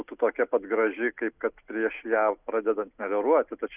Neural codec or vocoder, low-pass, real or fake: none; 3.6 kHz; real